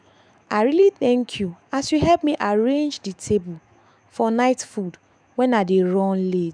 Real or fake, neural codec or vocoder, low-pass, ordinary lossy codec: fake; autoencoder, 48 kHz, 128 numbers a frame, DAC-VAE, trained on Japanese speech; 9.9 kHz; none